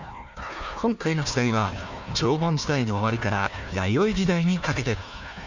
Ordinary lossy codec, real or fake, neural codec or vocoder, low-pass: none; fake; codec, 16 kHz, 1 kbps, FunCodec, trained on Chinese and English, 50 frames a second; 7.2 kHz